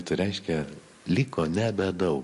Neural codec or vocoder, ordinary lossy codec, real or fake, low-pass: none; MP3, 48 kbps; real; 14.4 kHz